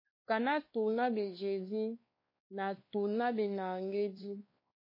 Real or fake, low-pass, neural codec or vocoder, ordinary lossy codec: fake; 5.4 kHz; codec, 16 kHz, 2 kbps, X-Codec, WavLM features, trained on Multilingual LibriSpeech; MP3, 24 kbps